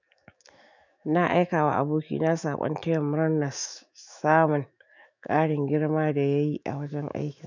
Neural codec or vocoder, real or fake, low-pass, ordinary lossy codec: autoencoder, 48 kHz, 128 numbers a frame, DAC-VAE, trained on Japanese speech; fake; 7.2 kHz; none